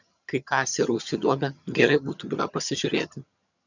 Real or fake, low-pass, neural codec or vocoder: fake; 7.2 kHz; vocoder, 22.05 kHz, 80 mel bands, HiFi-GAN